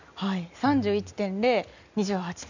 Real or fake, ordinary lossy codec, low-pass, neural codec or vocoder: real; none; 7.2 kHz; none